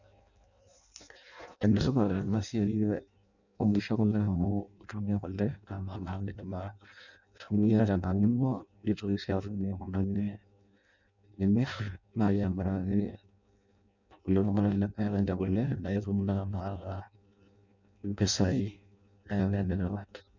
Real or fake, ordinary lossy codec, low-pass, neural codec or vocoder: fake; none; 7.2 kHz; codec, 16 kHz in and 24 kHz out, 0.6 kbps, FireRedTTS-2 codec